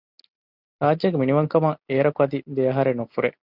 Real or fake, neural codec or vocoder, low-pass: real; none; 5.4 kHz